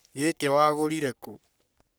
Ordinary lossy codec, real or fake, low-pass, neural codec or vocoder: none; fake; none; codec, 44.1 kHz, 3.4 kbps, Pupu-Codec